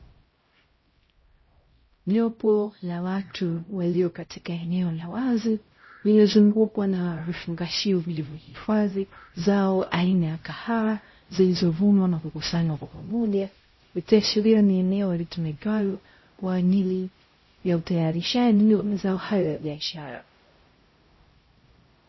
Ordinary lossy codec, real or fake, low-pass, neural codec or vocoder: MP3, 24 kbps; fake; 7.2 kHz; codec, 16 kHz, 0.5 kbps, X-Codec, HuBERT features, trained on LibriSpeech